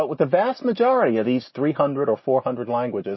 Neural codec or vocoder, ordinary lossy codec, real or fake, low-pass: none; MP3, 24 kbps; real; 7.2 kHz